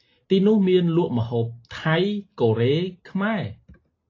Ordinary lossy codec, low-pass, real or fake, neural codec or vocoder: AAC, 32 kbps; 7.2 kHz; real; none